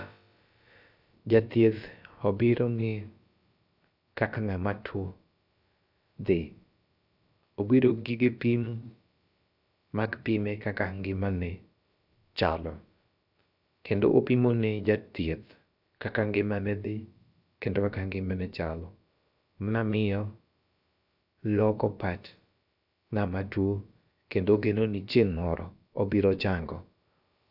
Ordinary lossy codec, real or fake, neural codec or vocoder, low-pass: none; fake; codec, 16 kHz, about 1 kbps, DyCAST, with the encoder's durations; 5.4 kHz